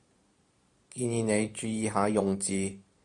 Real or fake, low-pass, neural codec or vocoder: fake; 10.8 kHz; vocoder, 44.1 kHz, 128 mel bands every 512 samples, BigVGAN v2